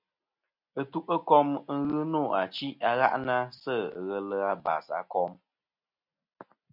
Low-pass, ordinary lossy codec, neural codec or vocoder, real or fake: 5.4 kHz; MP3, 48 kbps; none; real